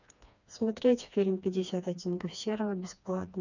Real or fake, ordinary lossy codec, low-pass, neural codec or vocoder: fake; none; 7.2 kHz; codec, 16 kHz, 2 kbps, FreqCodec, smaller model